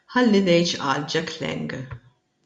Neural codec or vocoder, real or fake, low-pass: none; real; 9.9 kHz